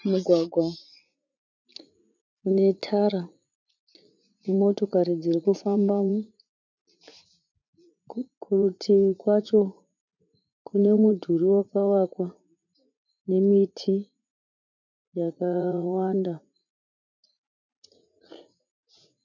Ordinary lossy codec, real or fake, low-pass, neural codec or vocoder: MP3, 64 kbps; fake; 7.2 kHz; vocoder, 44.1 kHz, 80 mel bands, Vocos